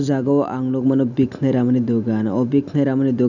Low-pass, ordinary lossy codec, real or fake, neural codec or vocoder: 7.2 kHz; none; real; none